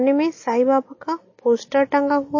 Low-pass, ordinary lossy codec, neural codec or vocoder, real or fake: 7.2 kHz; MP3, 32 kbps; none; real